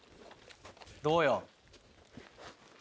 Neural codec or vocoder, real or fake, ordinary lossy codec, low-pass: none; real; none; none